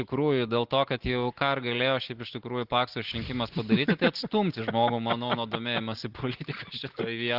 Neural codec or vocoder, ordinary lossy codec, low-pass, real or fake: none; Opus, 16 kbps; 5.4 kHz; real